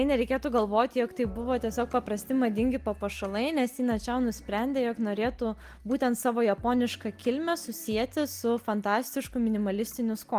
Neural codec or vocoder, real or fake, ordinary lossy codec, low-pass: none; real; Opus, 24 kbps; 14.4 kHz